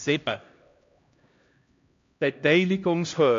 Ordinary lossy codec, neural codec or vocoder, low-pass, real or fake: AAC, 48 kbps; codec, 16 kHz, 1 kbps, X-Codec, HuBERT features, trained on LibriSpeech; 7.2 kHz; fake